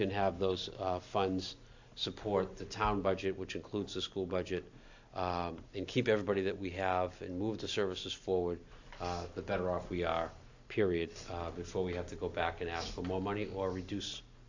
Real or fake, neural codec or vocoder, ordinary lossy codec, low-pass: real; none; AAC, 48 kbps; 7.2 kHz